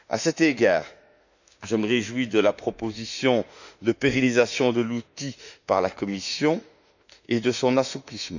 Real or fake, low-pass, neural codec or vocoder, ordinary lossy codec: fake; 7.2 kHz; autoencoder, 48 kHz, 32 numbers a frame, DAC-VAE, trained on Japanese speech; none